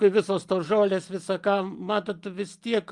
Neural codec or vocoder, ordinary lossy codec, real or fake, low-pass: none; Opus, 32 kbps; real; 10.8 kHz